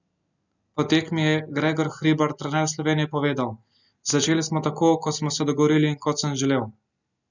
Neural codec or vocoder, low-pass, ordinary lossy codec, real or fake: none; 7.2 kHz; none; real